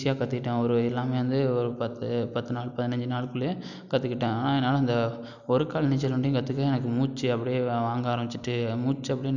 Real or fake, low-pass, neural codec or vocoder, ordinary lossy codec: real; 7.2 kHz; none; none